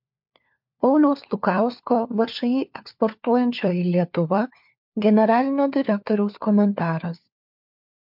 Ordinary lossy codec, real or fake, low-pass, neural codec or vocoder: MP3, 48 kbps; fake; 5.4 kHz; codec, 16 kHz, 4 kbps, FunCodec, trained on LibriTTS, 50 frames a second